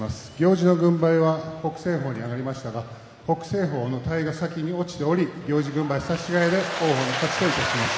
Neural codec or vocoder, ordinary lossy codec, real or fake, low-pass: none; none; real; none